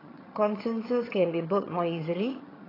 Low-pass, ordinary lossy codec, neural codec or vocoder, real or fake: 5.4 kHz; AAC, 24 kbps; vocoder, 22.05 kHz, 80 mel bands, HiFi-GAN; fake